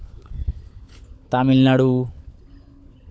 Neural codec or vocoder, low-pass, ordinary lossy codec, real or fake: codec, 16 kHz, 16 kbps, FunCodec, trained on LibriTTS, 50 frames a second; none; none; fake